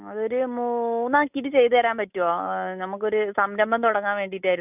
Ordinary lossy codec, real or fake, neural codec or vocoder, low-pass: none; real; none; 3.6 kHz